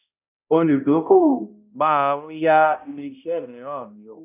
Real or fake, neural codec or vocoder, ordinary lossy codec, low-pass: fake; codec, 16 kHz, 0.5 kbps, X-Codec, HuBERT features, trained on balanced general audio; none; 3.6 kHz